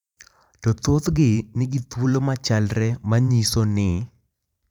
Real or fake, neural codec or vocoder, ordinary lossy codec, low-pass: fake; vocoder, 44.1 kHz, 128 mel bands every 512 samples, BigVGAN v2; none; 19.8 kHz